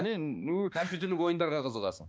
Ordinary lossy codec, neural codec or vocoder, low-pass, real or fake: none; codec, 16 kHz, 2 kbps, X-Codec, HuBERT features, trained on balanced general audio; none; fake